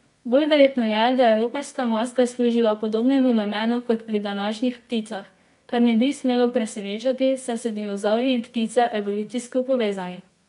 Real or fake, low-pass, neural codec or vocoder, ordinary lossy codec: fake; 10.8 kHz; codec, 24 kHz, 0.9 kbps, WavTokenizer, medium music audio release; none